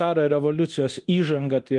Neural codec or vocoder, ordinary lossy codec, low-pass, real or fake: codec, 24 kHz, 0.9 kbps, DualCodec; Opus, 32 kbps; 10.8 kHz; fake